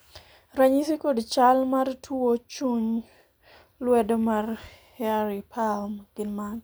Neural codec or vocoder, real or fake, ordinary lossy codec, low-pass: none; real; none; none